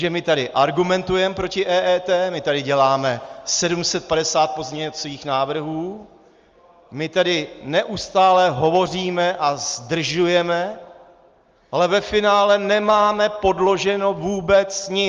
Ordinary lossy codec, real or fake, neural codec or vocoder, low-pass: Opus, 32 kbps; real; none; 7.2 kHz